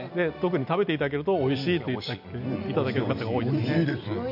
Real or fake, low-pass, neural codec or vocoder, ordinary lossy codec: real; 5.4 kHz; none; AAC, 48 kbps